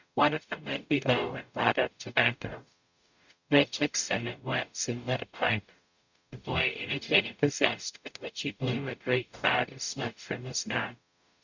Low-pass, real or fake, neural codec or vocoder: 7.2 kHz; fake; codec, 44.1 kHz, 0.9 kbps, DAC